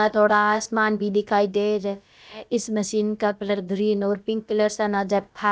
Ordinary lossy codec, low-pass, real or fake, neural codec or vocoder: none; none; fake; codec, 16 kHz, about 1 kbps, DyCAST, with the encoder's durations